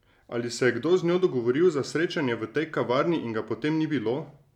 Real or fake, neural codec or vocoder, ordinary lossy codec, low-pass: real; none; none; 19.8 kHz